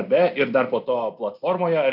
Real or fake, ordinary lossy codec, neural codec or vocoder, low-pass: real; AAC, 48 kbps; none; 5.4 kHz